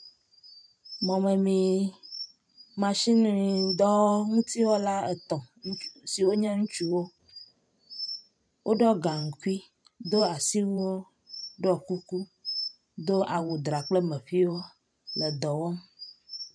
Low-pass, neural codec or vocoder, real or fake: 9.9 kHz; vocoder, 44.1 kHz, 128 mel bands every 512 samples, BigVGAN v2; fake